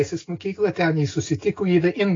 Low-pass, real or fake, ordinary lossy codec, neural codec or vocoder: 7.2 kHz; real; AAC, 32 kbps; none